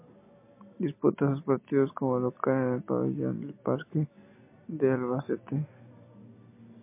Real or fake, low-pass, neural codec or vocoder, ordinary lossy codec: real; 3.6 kHz; none; MP3, 24 kbps